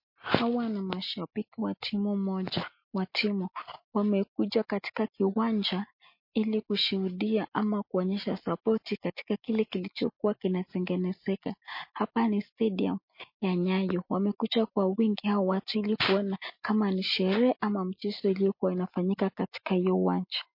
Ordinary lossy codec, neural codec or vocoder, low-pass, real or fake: MP3, 32 kbps; none; 5.4 kHz; real